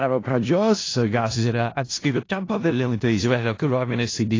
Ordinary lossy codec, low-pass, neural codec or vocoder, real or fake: AAC, 32 kbps; 7.2 kHz; codec, 16 kHz in and 24 kHz out, 0.4 kbps, LongCat-Audio-Codec, four codebook decoder; fake